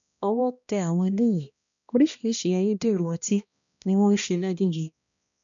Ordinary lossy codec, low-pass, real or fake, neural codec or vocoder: none; 7.2 kHz; fake; codec, 16 kHz, 1 kbps, X-Codec, HuBERT features, trained on balanced general audio